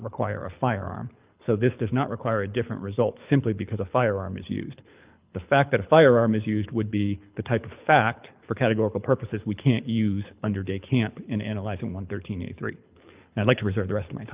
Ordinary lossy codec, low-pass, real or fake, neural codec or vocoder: Opus, 24 kbps; 3.6 kHz; fake; codec, 24 kHz, 6 kbps, HILCodec